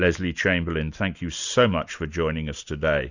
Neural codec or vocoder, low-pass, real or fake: none; 7.2 kHz; real